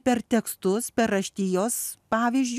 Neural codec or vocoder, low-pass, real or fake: none; 14.4 kHz; real